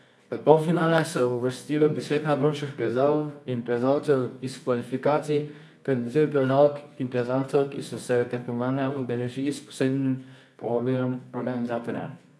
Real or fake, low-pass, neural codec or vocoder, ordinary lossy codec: fake; none; codec, 24 kHz, 0.9 kbps, WavTokenizer, medium music audio release; none